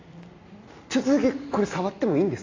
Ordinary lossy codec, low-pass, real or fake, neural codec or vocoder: AAC, 32 kbps; 7.2 kHz; real; none